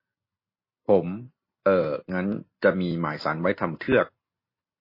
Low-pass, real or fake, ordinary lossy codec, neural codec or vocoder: 5.4 kHz; real; MP3, 24 kbps; none